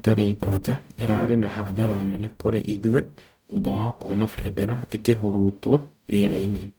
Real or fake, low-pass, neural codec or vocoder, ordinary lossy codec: fake; none; codec, 44.1 kHz, 0.9 kbps, DAC; none